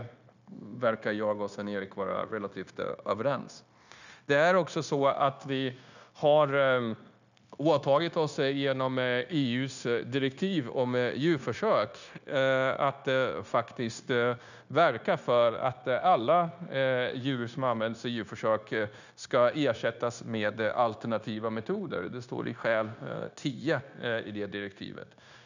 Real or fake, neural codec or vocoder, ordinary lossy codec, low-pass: fake; codec, 16 kHz, 0.9 kbps, LongCat-Audio-Codec; none; 7.2 kHz